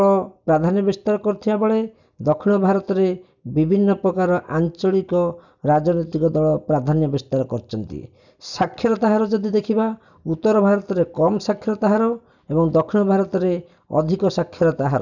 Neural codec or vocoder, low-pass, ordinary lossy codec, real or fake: none; 7.2 kHz; none; real